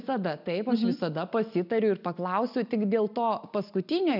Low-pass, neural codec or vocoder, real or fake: 5.4 kHz; none; real